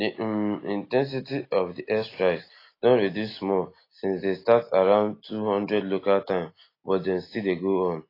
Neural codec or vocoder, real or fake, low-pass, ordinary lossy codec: none; real; 5.4 kHz; AAC, 24 kbps